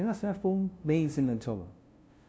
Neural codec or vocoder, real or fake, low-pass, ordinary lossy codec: codec, 16 kHz, 0.5 kbps, FunCodec, trained on LibriTTS, 25 frames a second; fake; none; none